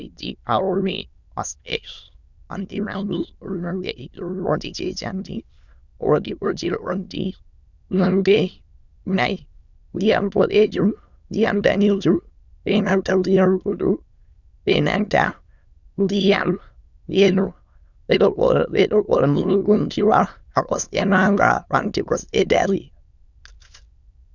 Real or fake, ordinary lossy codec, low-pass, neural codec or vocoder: fake; Opus, 64 kbps; 7.2 kHz; autoencoder, 22.05 kHz, a latent of 192 numbers a frame, VITS, trained on many speakers